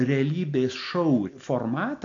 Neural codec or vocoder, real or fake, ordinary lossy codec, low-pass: none; real; AAC, 48 kbps; 7.2 kHz